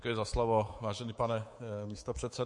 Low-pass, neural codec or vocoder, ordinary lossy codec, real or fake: 10.8 kHz; codec, 24 kHz, 3.1 kbps, DualCodec; MP3, 48 kbps; fake